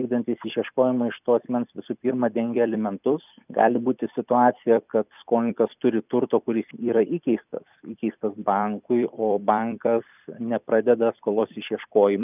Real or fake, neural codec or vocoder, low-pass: fake; vocoder, 44.1 kHz, 128 mel bands every 256 samples, BigVGAN v2; 3.6 kHz